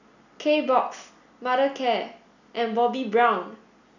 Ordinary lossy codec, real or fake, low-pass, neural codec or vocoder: none; real; 7.2 kHz; none